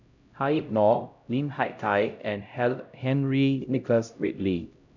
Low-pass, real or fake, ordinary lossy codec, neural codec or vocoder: 7.2 kHz; fake; none; codec, 16 kHz, 0.5 kbps, X-Codec, HuBERT features, trained on LibriSpeech